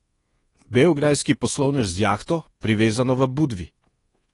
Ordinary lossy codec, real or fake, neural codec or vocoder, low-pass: AAC, 32 kbps; fake; codec, 24 kHz, 1.2 kbps, DualCodec; 10.8 kHz